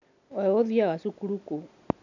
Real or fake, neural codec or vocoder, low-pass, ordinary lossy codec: real; none; 7.2 kHz; none